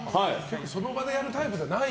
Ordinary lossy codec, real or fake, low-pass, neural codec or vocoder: none; real; none; none